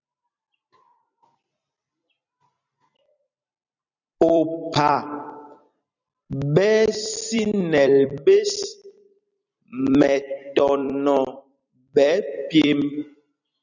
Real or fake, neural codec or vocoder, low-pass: fake; vocoder, 44.1 kHz, 128 mel bands every 256 samples, BigVGAN v2; 7.2 kHz